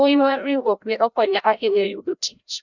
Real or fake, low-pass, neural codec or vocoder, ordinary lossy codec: fake; 7.2 kHz; codec, 16 kHz, 0.5 kbps, FreqCodec, larger model; none